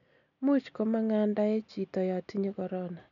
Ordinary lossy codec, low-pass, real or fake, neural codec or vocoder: none; 7.2 kHz; real; none